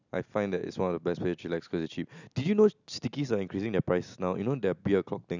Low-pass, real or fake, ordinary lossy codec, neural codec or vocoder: 7.2 kHz; real; none; none